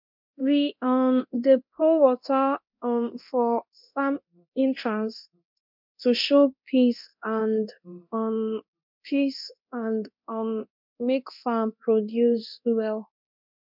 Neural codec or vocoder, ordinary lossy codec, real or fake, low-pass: codec, 24 kHz, 0.9 kbps, DualCodec; MP3, 48 kbps; fake; 5.4 kHz